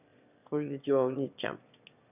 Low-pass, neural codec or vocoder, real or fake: 3.6 kHz; autoencoder, 22.05 kHz, a latent of 192 numbers a frame, VITS, trained on one speaker; fake